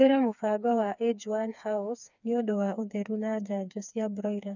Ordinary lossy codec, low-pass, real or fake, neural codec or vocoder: none; 7.2 kHz; fake; codec, 16 kHz, 4 kbps, FreqCodec, smaller model